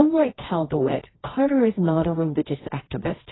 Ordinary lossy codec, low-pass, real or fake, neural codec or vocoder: AAC, 16 kbps; 7.2 kHz; fake; codec, 24 kHz, 0.9 kbps, WavTokenizer, medium music audio release